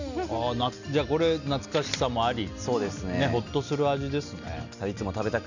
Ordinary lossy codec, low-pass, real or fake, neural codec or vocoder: none; 7.2 kHz; real; none